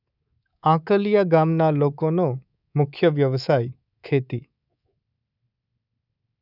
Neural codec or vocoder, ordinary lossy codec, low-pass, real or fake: codec, 24 kHz, 3.1 kbps, DualCodec; none; 5.4 kHz; fake